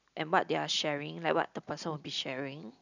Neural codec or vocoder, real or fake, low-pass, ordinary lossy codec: none; real; 7.2 kHz; none